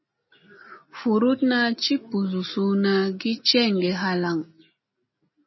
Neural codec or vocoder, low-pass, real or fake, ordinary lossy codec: none; 7.2 kHz; real; MP3, 24 kbps